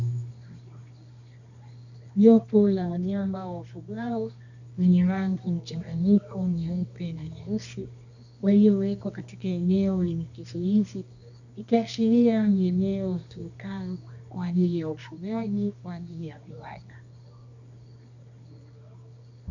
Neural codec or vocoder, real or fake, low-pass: codec, 24 kHz, 0.9 kbps, WavTokenizer, medium music audio release; fake; 7.2 kHz